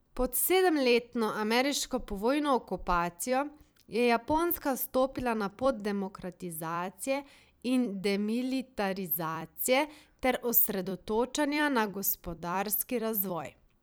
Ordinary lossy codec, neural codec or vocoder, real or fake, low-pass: none; vocoder, 44.1 kHz, 128 mel bands every 256 samples, BigVGAN v2; fake; none